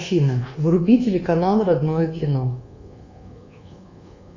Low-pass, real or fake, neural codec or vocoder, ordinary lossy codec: 7.2 kHz; fake; codec, 24 kHz, 1.2 kbps, DualCodec; Opus, 64 kbps